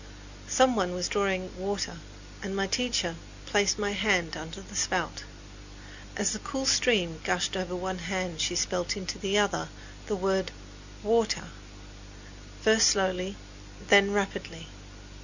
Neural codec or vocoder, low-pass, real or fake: none; 7.2 kHz; real